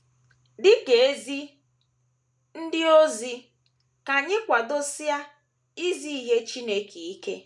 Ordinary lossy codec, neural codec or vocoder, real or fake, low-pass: none; none; real; none